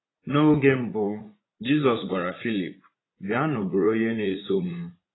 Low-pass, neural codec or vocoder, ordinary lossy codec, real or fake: 7.2 kHz; vocoder, 44.1 kHz, 80 mel bands, Vocos; AAC, 16 kbps; fake